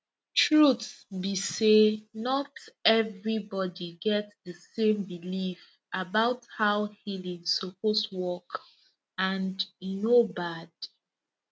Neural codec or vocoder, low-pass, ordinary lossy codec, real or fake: none; none; none; real